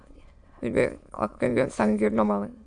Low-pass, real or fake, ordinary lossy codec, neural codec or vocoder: 9.9 kHz; fake; AAC, 64 kbps; autoencoder, 22.05 kHz, a latent of 192 numbers a frame, VITS, trained on many speakers